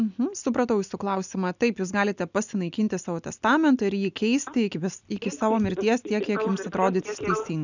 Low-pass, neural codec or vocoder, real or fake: 7.2 kHz; none; real